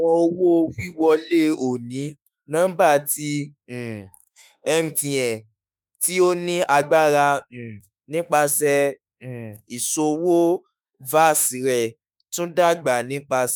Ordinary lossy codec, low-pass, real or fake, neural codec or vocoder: none; none; fake; autoencoder, 48 kHz, 32 numbers a frame, DAC-VAE, trained on Japanese speech